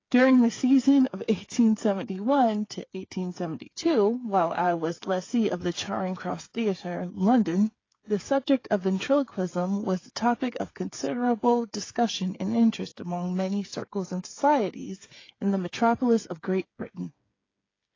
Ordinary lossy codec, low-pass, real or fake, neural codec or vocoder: AAC, 32 kbps; 7.2 kHz; fake; codec, 16 kHz, 8 kbps, FreqCodec, smaller model